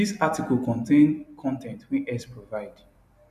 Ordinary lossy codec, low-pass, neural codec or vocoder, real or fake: none; 14.4 kHz; vocoder, 44.1 kHz, 128 mel bands every 256 samples, BigVGAN v2; fake